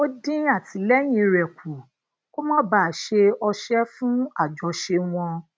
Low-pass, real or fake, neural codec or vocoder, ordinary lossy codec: none; real; none; none